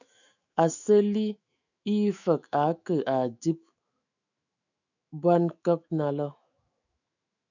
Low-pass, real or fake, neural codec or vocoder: 7.2 kHz; fake; autoencoder, 48 kHz, 128 numbers a frame, DAC-VAE, trained on Japanese speech